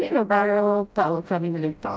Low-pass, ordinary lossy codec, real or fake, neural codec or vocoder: none; none; fake; codec, 16 kHz, 0.5 kbps, FreqCodec, smaller model